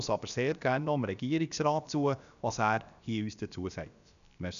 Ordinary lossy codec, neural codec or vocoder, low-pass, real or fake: none; codec, 16 kHz, about 1 kbps, DyCAST, with the encoder's durations; 7.2 kHz; fake